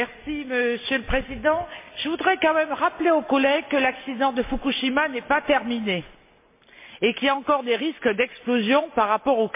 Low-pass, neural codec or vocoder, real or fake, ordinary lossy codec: 3.6 kHz; none; real; MP3, 24 kbps